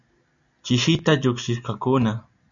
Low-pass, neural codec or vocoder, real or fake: 7.2 kHz; none; real